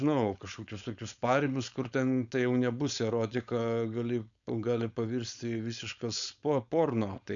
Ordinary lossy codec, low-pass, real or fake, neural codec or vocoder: MP3, 96 kbps; 7.2 kHz; fake; codec, 16 kHz, 4.8 kbps, FACodec